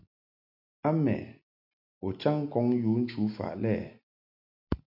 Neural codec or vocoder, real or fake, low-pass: none; real; 5.4 kHz